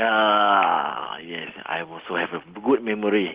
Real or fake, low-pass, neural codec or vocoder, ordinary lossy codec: real; 3.6 kHz; none; Opus, 16 kbps